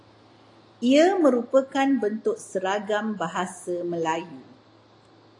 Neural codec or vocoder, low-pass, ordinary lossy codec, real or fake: none; 10.8 kHz; AAC, 48 kbps; real